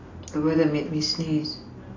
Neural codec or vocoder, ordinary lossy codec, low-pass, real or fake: none; AAC, 32 kbps; 7.2 kHz; real